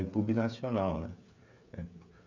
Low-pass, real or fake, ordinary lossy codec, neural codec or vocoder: 7.2 kHz; fake; none; codec, 16 kHz, 8 kbps, FreqCodec, smaller model